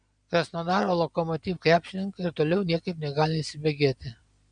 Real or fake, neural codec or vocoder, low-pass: real; none; 9.9 kHz